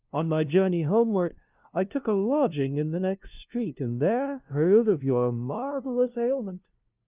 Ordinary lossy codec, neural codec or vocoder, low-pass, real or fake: Opus, 24 kbps; codec, 16 kHz, 0.5 kbps, FunCodec, trained on LibriTTS, 25 frames a second; 3.6 kHz; fake